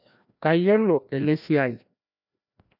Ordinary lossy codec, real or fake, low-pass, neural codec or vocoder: none; fake; 5.4 kHz; codec, 16 kHz, 1 kbps, FreqCodec, larger model